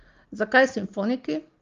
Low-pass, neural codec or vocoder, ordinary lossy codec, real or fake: 7.2 kHz; none; Opus, 32 kbps; real